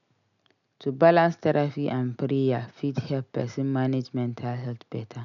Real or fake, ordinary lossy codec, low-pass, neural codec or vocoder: real; none; 7.2 kHz; none